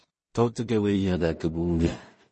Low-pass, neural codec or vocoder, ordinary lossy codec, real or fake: 10.8 kHz; codec, 16 kHz in and 24 kHz out, 0.4 kbps, LongCat-Audio-Codec, two codebook decoder; MP3, 32 kbps; fake